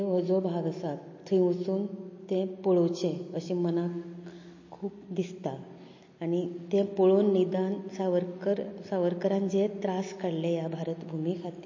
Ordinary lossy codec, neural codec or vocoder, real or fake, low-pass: MP3, 32 kbps; none; real; 7.2 kHz